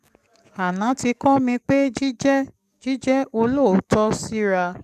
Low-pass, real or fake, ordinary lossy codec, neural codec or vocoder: 14.4 kHz; fake; none; codec, 44.1 kHz, 7.8 kbps, DAC